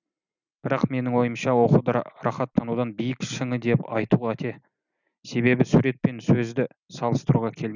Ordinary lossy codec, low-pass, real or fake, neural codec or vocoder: none; 7.2 kHz; real; none